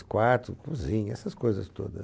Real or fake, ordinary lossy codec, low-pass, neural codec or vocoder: real; none; none; none